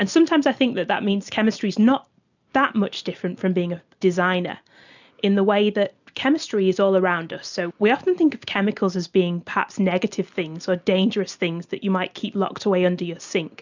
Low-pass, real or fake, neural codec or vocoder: 7.2 kHz; real; none